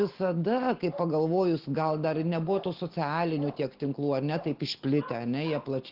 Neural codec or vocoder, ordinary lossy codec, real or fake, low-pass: none; Opus, 16 kbps; real; 5.4 kHz